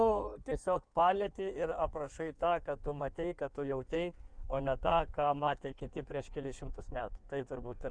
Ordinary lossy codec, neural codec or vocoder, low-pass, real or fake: Opus, 64 kbps; codec, 16 kHz in and 24 kHz out, 1.1 kbps, FireRedTTS-2 codec; 9.9 kHz; fake